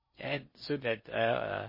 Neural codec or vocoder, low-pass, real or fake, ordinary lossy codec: codec, 16 kHz in and 24 kHz out, 0.6 kbps, FocalCodec, streaming, 4096 codes; 5.4 kHz; fake; MP3, 24 kbps